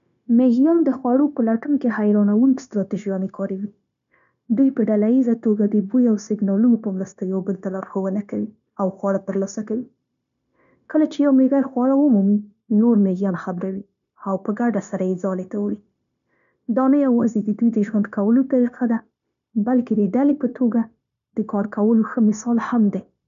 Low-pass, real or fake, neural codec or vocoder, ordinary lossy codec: 7.2 kHz; fake; codec, 16 kHz, 0.9 kbps, LongCat-Audio-Codec; none